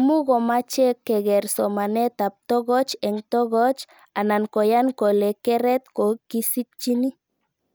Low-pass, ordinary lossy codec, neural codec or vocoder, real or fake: none; none; none; real